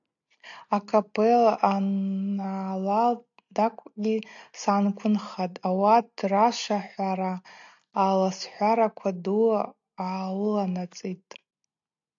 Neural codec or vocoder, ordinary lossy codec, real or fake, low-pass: none; AAC, 64 kbps; real; 7.2 kHz